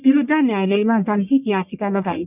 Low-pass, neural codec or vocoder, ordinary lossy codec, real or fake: 3.6 kHz; codec, 44.1 kHz, 1.7 kbps, Pupu-Codec; none; fake